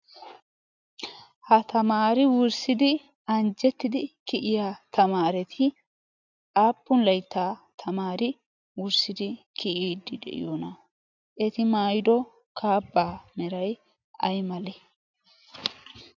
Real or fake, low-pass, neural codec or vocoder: real; 7.2 kHz; none